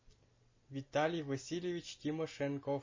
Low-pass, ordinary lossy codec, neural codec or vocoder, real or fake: 7.2 kHz; MP3, 32 kbps; none; real